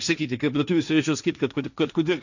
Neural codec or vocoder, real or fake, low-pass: codec, 16 kHz, 1.1 kbps, Voila-Tokenizer; fake; 7.2 kHz